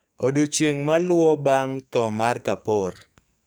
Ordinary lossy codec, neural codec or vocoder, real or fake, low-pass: none; codec, 44.1 kHz, 2.6 kbps, SNAC; fake; none